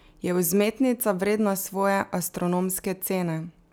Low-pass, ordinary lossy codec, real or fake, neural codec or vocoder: none; none; real; none